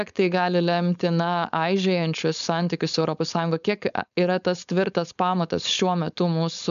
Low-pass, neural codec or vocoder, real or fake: 7.2 kHz; codec, 16 kHz, 4.8 kbps, FACodec; fake